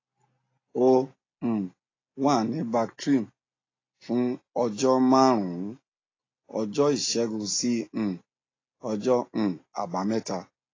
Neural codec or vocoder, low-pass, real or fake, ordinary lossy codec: none; 7.2 kHz; real; AAC, 32 kbps